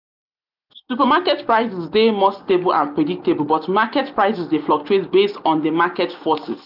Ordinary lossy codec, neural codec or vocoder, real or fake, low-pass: none; none; real; 5.4 kHz